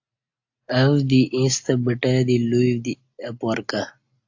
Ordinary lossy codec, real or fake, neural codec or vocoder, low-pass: AAC, 48 kbps; real; none; 7.2 kHz